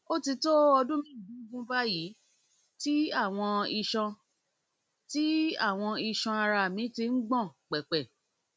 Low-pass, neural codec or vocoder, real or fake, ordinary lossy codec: none; none; real; none